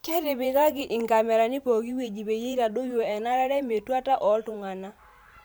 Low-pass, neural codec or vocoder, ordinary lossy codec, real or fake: none; vocoder, 44.1 kHz, 128 mel bands every 512 samples, BigVGAN v2; none; fake